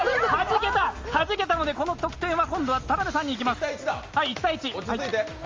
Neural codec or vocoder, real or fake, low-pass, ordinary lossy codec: none; real; 7.2 kHz; Opus, 32 kbps